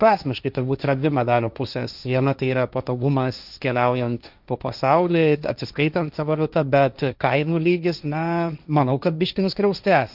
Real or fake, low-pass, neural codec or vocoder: fake; 5.4 kHz; codec, 16 kHz, 1.1 kbps, Voila-Tokenizer